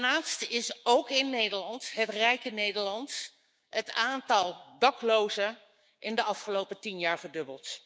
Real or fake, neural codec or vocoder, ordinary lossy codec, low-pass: fake; codec, 16 kHz, 6 kbps, DAC; none; none